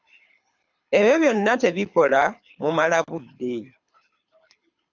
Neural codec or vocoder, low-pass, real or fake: codec, 24 kHz, 6 kbps, HILCodec; 7.2 kHz; fake